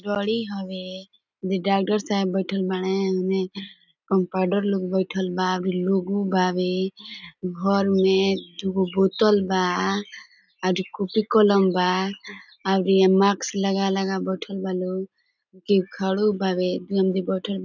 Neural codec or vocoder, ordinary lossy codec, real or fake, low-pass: none; none; real; 7.2 kHz